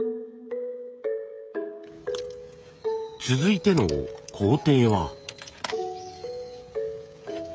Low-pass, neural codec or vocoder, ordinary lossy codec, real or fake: none; codec, 16 kHz, 16 kbps, FreqCodec, smaller model; none; fake